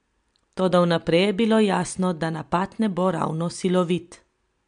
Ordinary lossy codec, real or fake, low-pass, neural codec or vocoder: MP3, 64 kbps; real; 9.9 kHz; none